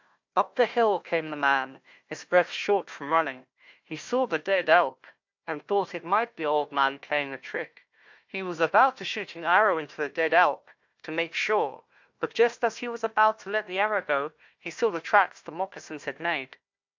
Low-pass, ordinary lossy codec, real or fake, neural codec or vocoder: 7.2 kHz; MP3, 64 kbps; fake; codec, 16 kHz, 1 kbps, FunCodec, trained on Chinese and English, 50 frames a second